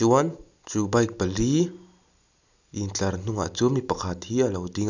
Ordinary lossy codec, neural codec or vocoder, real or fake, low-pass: none; none; real; 7.2 kHz